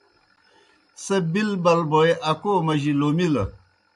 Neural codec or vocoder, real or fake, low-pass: none; real; 10.8 kHz